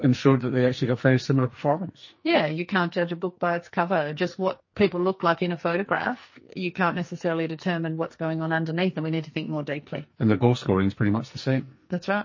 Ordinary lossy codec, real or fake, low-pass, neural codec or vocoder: MP3, 32 kbps; fake; 7.2 kHz; codec, 44.1 kHz, 2.6 kbps, SNAC